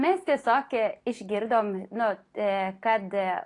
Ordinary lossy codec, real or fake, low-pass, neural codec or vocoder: AAC, 48 kbps; real; 10.8 kHz; none